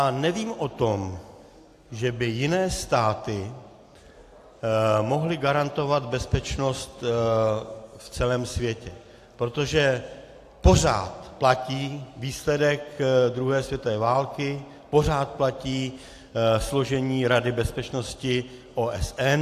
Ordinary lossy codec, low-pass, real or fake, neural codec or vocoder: AAC, 64 kbps; 14.4 kHz; real; none